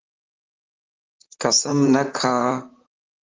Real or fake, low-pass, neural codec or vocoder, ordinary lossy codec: fake; 7.2 kHz; vocoder, 44.1 kHz, 128 mel bands, Pupu-Vocoder; Opus, 24 kbps